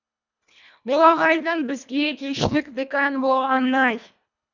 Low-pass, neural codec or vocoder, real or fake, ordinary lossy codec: 7.2 kHz; codec, 24 kHz, 1.5 kbps, HILCodec; fake; none